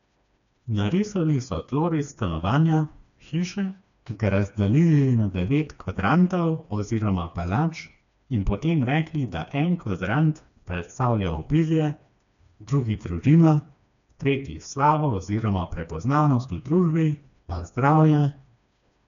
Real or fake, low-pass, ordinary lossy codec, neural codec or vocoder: fake; 7.2 kHz; none; codec, 16 kHz, 2 kbps, FreqCodec, smaller model